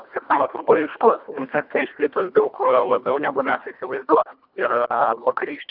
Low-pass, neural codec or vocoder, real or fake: 5.4 kHz; codec, 24 kHz, 1.5 kbps, HILCodec; fake